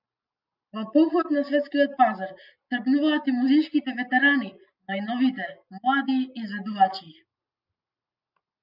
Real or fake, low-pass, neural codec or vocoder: real; 5.4 kHz; none